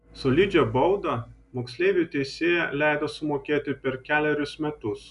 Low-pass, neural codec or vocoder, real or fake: 10.8 kHz; none; real